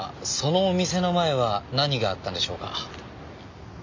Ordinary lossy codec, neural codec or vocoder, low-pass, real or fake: MP3, 48 kbps; none; 7.2 kHz; real